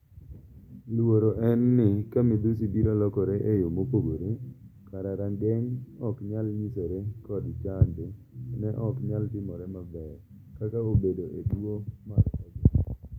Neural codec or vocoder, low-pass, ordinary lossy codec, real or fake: none; 19.8 kHz; none; real